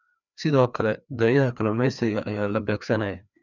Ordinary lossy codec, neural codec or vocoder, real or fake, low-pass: none; codec, 16 kHz, 2 kbps, FreqCodec, larger model; fake; 7.2 kHz